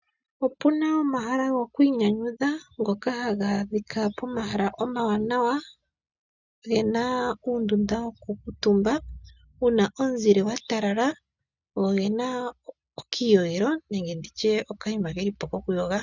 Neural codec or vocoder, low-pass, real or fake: none; 7.2 kHz; real